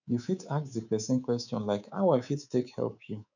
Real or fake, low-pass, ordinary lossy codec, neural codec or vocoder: fake; 7.2 kHz; none; codec, 24 kHz, 3.1 kbps, DualCodec